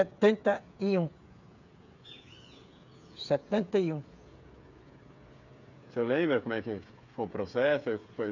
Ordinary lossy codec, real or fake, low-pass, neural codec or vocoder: none; fake; 7.2 kHz; codec, 16 kHz, 8 kbps, FreqCodec, smaller model